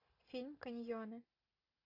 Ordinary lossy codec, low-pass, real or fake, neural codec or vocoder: AAC, 32 kbps; 5.4 kHz; real; none